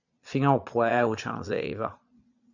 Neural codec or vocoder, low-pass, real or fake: vocoder, 22.05 kHz, 80 mel bands, Vocos; 7.2 kHz; fake